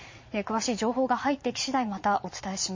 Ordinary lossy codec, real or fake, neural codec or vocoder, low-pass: MP3, 32 kbps; fake; vocoder, 22.05 kHz, 80 mel bands, Vocos; 7.2 kHz